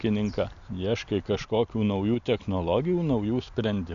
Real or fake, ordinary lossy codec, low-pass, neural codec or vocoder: real; MP3, 64 kbps; 7.2 kHz; none